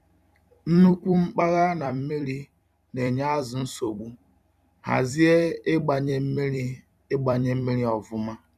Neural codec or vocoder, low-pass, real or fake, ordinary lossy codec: vocoder, 44.1 kHz, 128 mel bands every 256 samples, BigVGAN v2; 14.4 kHz; fake; none